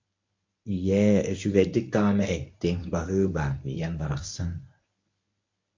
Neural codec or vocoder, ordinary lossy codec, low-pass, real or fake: codec, 24 kHz, 0.9 kbps, WavTokenizer, medium speech release version 1; MP3, 48 kbps; 7.2 kHz; fake